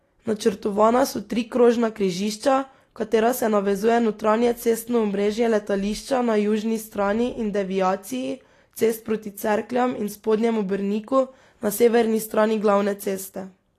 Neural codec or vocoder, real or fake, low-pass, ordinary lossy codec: none; real; 14.4 kHz; AAC, 48 kbps